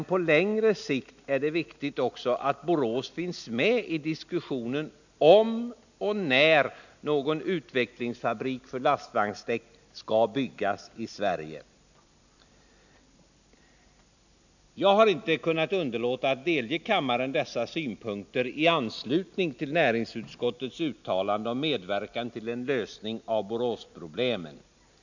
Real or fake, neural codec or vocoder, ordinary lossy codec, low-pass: real; none; none; 7.2 kHz